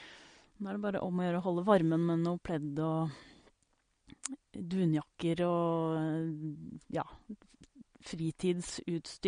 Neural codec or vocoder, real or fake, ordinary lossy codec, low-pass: none; real; MP3, 48 kbps; 9.9 kHz